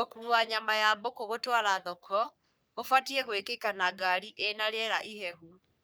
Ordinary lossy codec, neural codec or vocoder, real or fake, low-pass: none; codec, 44.1 kHz, 3.4 kbps, Pupu-Codec; fake; none